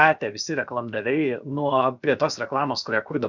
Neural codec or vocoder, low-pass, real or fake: codec, 16 kHz, 0.7 kbps, FocalCodec; 7.2 kHz; fake